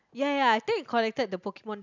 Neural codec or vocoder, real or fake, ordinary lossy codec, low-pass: none; real; none; 7.2 kHz